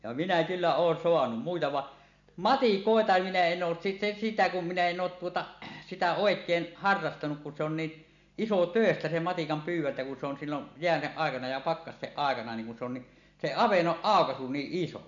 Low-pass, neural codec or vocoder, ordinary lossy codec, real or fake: 7.2 kHz; none; none; real